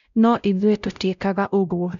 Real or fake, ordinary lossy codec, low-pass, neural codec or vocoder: fake; none; 7.2 kHz; codec, 16 kHz, 0.5 kbps, X-Codec, WavLM features, trained on Multilingual LibriSpeech